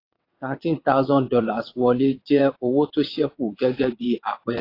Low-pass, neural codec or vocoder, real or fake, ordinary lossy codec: 5.4 kHz; vocoder, 22.05 kHz, 80 mel bands, WaveNeXt; fake; AAC, 32 kbps